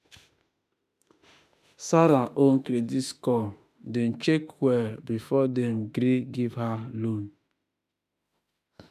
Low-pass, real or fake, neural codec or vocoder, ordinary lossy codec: 14.4 kHz; fake; autoencoder, 48 kHz, 32 numbers a frame, DAC-VAE, trained on Japanese speech; none